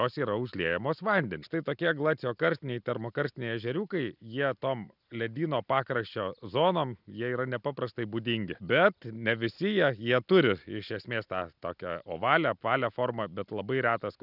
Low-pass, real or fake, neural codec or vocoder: 5.4 kHz; real; none